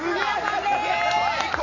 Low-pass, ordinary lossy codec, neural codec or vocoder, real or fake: 7.2 kHz; none; none; real